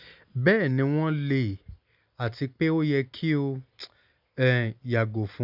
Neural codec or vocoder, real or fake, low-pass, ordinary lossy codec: none; real; 5.4 kHz; none